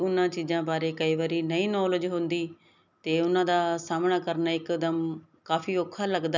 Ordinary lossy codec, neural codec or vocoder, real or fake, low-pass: none; none; real; 7.2 kHz